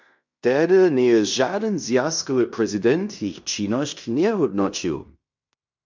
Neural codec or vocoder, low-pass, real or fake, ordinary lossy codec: codec, 16 kHz in and 24 kHz out, 0.9 kbps, LongCat-Audio-Codec, fine tuned four codebook decoder; 7.2 kHz; fake; MP3, 64 kbps